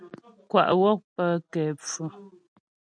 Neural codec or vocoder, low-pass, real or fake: none; 9.9 kHz; real